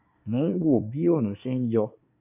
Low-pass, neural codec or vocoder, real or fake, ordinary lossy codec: 3.6 kHz; codec, 24 kHz, 1 kbps, SNAC; fake; none